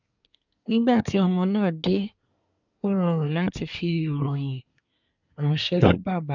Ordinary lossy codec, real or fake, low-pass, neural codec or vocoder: none; fake; 7.2 kHz; codec, 24 kHz, 1 kbps, SNAC